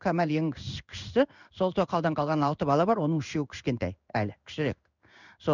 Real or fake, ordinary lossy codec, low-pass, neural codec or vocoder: fake; none; 7.2 kHz; codec, 16 kHz in and 24 kHz out, 1 kbps, XY-Tokenizer